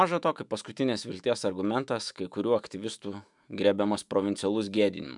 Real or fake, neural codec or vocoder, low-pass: fake; autoencoder, 48 kHz, 128 numbers a frame, DAC-VAE, trained on Japanese speech; 10.8 kHz